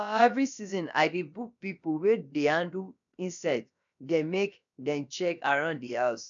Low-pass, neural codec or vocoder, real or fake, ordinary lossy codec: 7.2 kHz; codec, 16 kHz, about 1 kbps, DyCAST, with the encoder's durations; fake; none